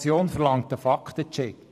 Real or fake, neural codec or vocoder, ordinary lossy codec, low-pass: fake; vocoder, 44.1 kHz, 128 mel bands every 512 samples, BigVGAN v2; none; 14.4 kHz